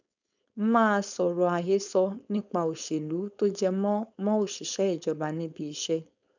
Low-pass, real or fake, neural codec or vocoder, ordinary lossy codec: 7.2 kHz; fake; codec, 16 kHz, 4.8 kbps, FACodec; none